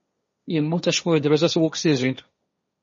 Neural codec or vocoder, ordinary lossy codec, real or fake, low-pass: codec, 16 kHz, 1.1 kbps, Voila-Tokenizer; MP3, 32 kbps; fake; 7.2 kHz